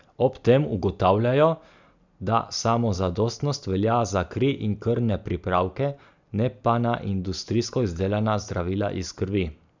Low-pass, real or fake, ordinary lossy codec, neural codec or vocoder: 7.2 kHz; real; none; none